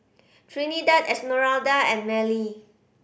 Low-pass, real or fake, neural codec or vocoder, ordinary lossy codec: none; real; none; none